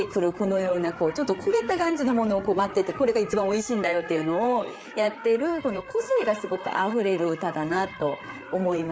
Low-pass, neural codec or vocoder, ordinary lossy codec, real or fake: none; codec, 16 kHz, 4 kbps, FreqCodec, larger model; none; fake